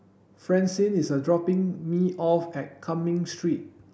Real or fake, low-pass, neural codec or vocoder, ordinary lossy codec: real; none; none; none